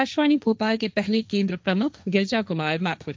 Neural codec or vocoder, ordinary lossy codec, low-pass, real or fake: codec, 16 kHz, 1.1 kbps, Voila-Tokenizer; none; none; fake